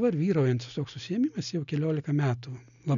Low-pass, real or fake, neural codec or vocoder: 7.2 kHz; real; none